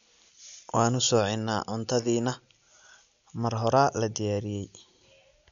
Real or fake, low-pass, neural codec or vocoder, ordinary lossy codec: real; 7.2 kHz; none; none